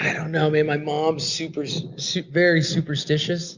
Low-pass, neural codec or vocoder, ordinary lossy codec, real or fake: 7.2 kHz; none; Opus, 64 kbps; real